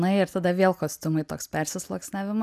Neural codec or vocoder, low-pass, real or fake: none; 14.4 kHz; real